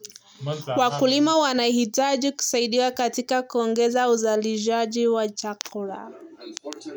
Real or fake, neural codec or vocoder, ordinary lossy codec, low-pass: real; none; none; none